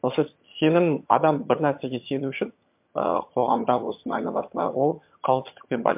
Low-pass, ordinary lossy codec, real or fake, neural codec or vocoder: 3.6 kHz; MP3, 32 kbps; fake; vocoder, 22.05 kHz, 80 mel bands, HiFi-GAN